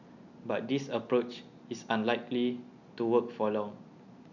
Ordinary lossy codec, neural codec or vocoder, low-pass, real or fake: none; none; 7.2 kHz; real